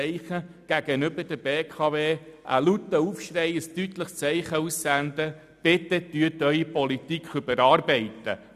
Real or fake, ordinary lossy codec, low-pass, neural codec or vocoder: real; none; 14.4 kHz; none